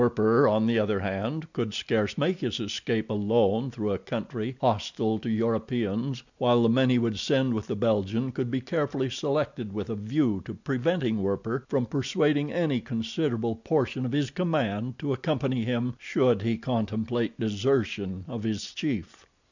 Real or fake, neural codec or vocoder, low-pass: real; none; 7.2 kHz